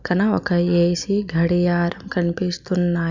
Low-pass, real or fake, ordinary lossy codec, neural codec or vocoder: 7.2 kHz; real; Opus, 64 kbps; none